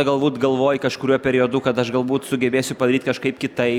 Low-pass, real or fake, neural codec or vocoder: 19.8 kHz; real; none